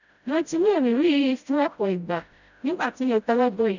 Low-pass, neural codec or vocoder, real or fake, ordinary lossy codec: 7.2 kHz; codec, 16 kHz, 0.5 kbps, FreqCodec, smaller model; fake; none